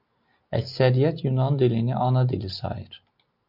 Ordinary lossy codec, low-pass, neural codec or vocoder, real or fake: MP3, 48 kbps; 5.4 kHz; none; real